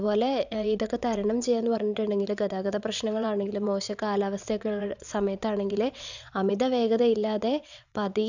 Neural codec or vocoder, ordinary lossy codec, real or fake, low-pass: vocoder, 22.05 kHz, 80 mel bands, WaveNeXt; none; fake; 7.2 kHz